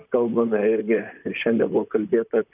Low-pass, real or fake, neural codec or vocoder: 3.6 kHz; fake; vocoder, 44.1 kHz, 128 mel bands, Pupu-Vocoder